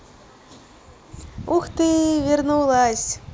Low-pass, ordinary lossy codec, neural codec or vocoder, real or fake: none; none; none; real